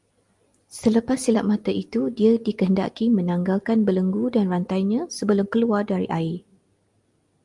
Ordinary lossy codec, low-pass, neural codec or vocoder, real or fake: Opus, 32 kbps; 10.8 kHz; none; real